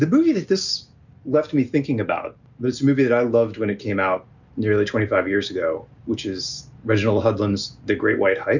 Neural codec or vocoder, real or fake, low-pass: none; real; 7.2 kHz